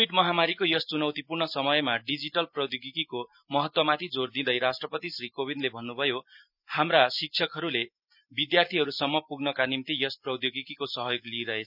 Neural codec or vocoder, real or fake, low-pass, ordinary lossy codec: none; real; 5.4 kHz; none